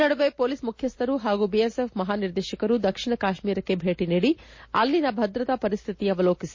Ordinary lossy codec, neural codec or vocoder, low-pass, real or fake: MP3, 32 kbps; none; 7.2 kHz; real